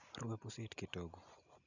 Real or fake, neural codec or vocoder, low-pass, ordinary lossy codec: real; none; 7.2 kHz; none